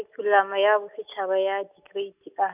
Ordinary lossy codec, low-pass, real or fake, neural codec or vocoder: none; 3.6 kHz; real; none